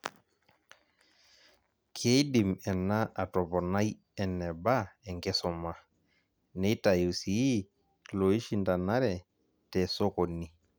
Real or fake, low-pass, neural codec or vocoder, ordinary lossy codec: real; none; none; none